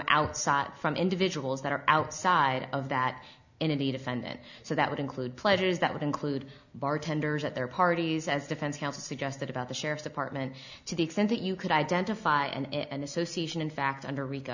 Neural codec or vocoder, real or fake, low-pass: none; real; 7.2 kHz